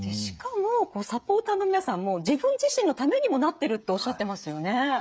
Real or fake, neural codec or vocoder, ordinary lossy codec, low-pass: fake; codec, 16 kHz, 16 kbps, FreqCodec, smaller model; none; none